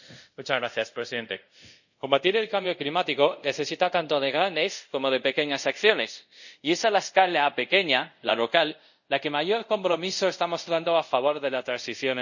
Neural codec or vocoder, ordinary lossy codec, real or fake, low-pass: codec, 24 kHz, 0.5 kbps, DualCodec; none; fake; 7.2 kHz